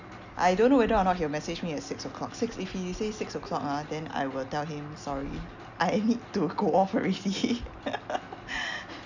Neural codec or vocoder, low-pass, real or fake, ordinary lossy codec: none; 7.2 kHz; real; none